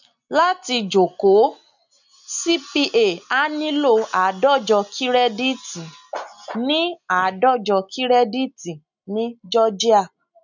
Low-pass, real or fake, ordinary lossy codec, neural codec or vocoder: 7.2 kHz; real; none; none